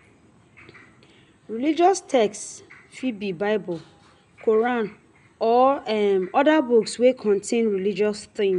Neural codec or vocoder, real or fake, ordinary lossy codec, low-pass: none; real; none; 10.8 kHz